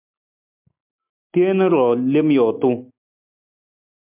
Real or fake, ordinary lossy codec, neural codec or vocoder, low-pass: real; MP3, 32 kbps; none; 3.6 kHz